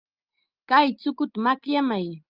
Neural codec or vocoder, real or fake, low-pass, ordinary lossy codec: none; real; 5.4 kHz; Opus, 24 kbps